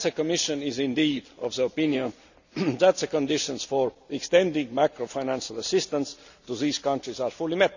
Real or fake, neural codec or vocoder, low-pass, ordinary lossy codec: real; none; 7.2 kHz; none